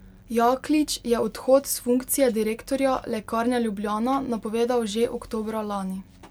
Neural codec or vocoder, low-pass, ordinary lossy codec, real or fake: none; 19.8 kHz; MP3, 96 kbps; real